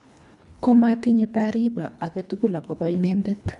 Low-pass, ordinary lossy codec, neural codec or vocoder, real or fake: 10.8 kHz; none; codec, 24 kHz, 1.5 kbps, HILCodec; fake